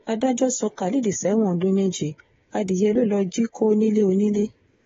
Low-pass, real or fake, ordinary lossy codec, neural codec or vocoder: 7.2 kHz; fake; AAC, 24 kbps; codec, 16 kHz, 8 kbps, FreqCodec, smaller model